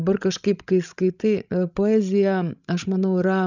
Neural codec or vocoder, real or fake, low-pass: codec, 16 kHz, 16 kbps, FreqCodec, larger model; fake; 7.2 kHz